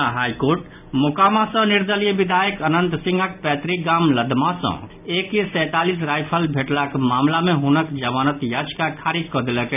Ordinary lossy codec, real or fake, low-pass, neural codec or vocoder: none; real; 3.6 kHz; none